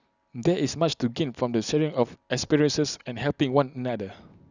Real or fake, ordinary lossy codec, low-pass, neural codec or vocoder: real; none; 7.2 kHz; none